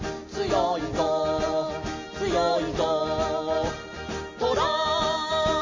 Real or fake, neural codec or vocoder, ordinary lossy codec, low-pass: real; none; MP3, 32 kbps; 7.2 kHz